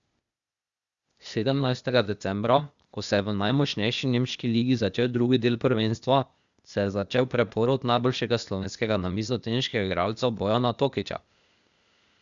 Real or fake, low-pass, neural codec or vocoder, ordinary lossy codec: fake; 7.2 kHz; codec, 16 kHz, 0.8 kbps, ZipCodec; Opus, 64 kbps